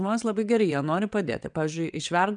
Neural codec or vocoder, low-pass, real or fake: vocoder, 22.05 kHz, 80 mel bands, WaveNeXt; 9.9 kHz; fake